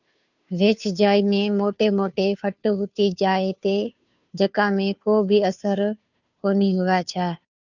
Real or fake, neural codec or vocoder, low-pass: fake; codec, 16 kHz, 2 kbps, FunCodec, trained on Chinese and English, 25 frames a second; 7.2 kHz